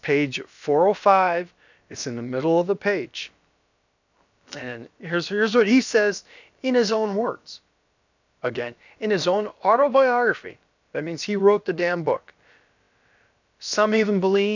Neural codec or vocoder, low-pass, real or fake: codec, 16 kHz, about 1 kbps, DyCAST, with the encoder's durations; 7.2 kHz; fake